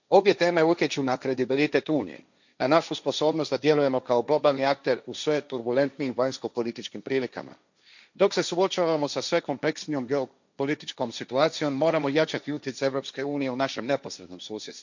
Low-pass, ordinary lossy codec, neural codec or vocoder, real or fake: 7.2 kHz; none; codec, 16 kHz, 1.1 kbps, Voila-Tokenizer; fake